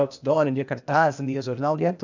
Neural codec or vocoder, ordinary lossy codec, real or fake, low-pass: codec, 16 kHz, 0.8 kbps, ZipCodec; none; fake; 7.2 kHz